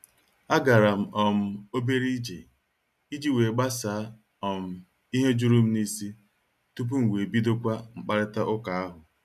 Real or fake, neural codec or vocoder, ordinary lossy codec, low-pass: real; none; none; 14.4 kHz